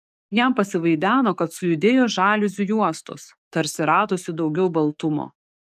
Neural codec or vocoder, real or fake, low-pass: codec, 44.1 kHz, 7.8 kbps, DAC; fake; 14.4 kHz